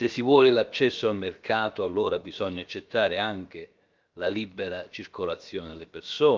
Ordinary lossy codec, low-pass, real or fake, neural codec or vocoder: Opus, 24 kbps; 7.2 kHz; fake; codec, 16 kHz, about 1 kbps, DyCAST, with the encoder's durations